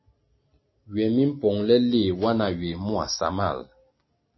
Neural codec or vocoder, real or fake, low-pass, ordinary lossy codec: none; real; 7.2 kHz; MP3, 24 kbps